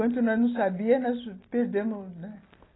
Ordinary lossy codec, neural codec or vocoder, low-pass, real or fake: AAC, 16 kbps; none; 7.2 kHz; real